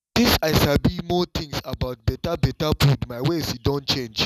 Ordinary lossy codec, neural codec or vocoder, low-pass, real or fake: none; none; 14.4 kHz; real